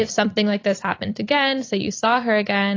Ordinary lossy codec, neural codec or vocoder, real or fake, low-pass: AAC, 32 kbps; vocoder, 44.1 kHz, 128 mel bands every 256 samples, BigVGAN v2; fake; 7.2 kHz